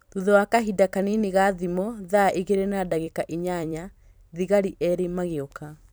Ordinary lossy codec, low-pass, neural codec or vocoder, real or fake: none; none; vocoder, 44.1 kHz, 128 mel bands every 256 samples, BigVGAN v2; fake